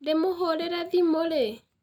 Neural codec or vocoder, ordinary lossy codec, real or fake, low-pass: none; none; real; 19.8 kHz